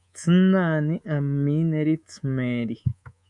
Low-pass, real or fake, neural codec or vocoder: 10.8 kHz; fake; codec, 24 kHz, 3.1 kbps, DualCodec